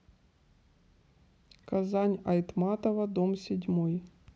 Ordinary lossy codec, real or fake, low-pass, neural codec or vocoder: none; real; none; none